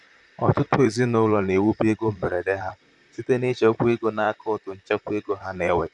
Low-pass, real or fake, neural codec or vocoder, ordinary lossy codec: 10.8 kHz; fake; vocoder, 44.1 kHz, 128 mel bands, Pupu-Vocoder; none